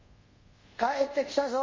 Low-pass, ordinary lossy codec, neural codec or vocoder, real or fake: 7.2 kHz; MP3, 32 kbps; codec, 24 kHz, 0.5 kbps, DualCodec; fake